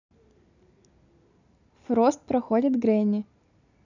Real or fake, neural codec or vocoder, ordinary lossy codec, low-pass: real; none; none; 7.2 kHz